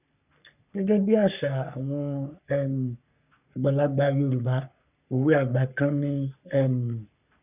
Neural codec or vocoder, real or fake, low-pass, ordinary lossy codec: codec, 44.1 kHz, 3.4 kbps, Pupu-Codec; fake; 3.6 kHz; none